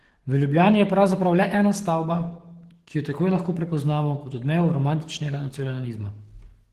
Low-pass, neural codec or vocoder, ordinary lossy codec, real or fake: 14.4 kHz; codec, 44.1 kHz, 7.8 kbps, DAC; Opus, 16 kbps; fake